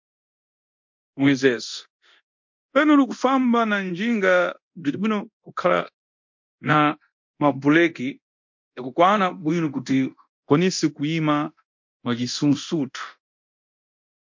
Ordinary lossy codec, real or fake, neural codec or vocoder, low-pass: MP3, 48 kbps; fake; codec, 24 kHz, 0.9 kbps, DualCodec; 7.2 kHz